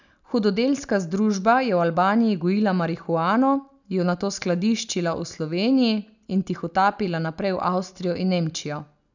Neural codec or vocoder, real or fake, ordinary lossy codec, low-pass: none; real; none; 7.2 kHz